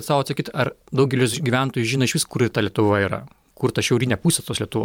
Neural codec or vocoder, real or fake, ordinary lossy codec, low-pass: vocoder, 44.1 kHz, 128 mel bands every 512 samples, BigVGAN v2; fake; MP3, 96 kbps; 19.8 kHz